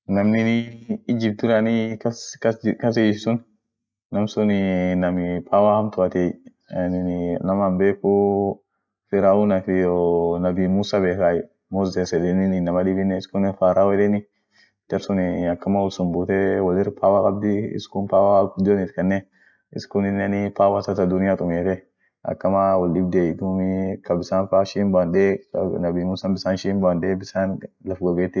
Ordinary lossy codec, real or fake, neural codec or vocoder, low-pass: none; real; none; none